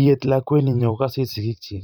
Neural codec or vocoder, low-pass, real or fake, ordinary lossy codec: vocoder, 44.1 kHz, 128 mel bands every 512 samples, BigVGAN v2; 19.8 kHz; fake; none